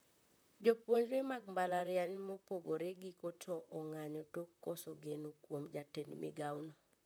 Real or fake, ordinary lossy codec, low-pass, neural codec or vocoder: fake; none; none; vocoder, 44.1 kHz, 128 mel bands, Pupu-Vocoder